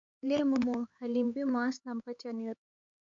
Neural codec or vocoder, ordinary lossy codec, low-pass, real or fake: codec, 16 kHz, 4 kbps, X-Codec, HuBERT features, trained on LibriSpeech; MP3, 48 kbps; 7.2 kHz; fake